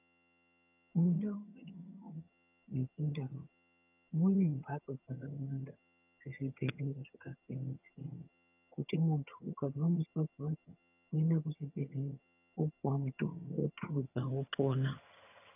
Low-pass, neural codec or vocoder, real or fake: 3.6 kHz; vocoder, 22.05 kHz, 80 mel bands, HiFi-GAN; fake